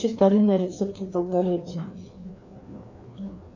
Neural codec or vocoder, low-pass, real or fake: codec, 16 kHz, 2 kbps, FreqCodec, larger model; 7.2 kHz; fake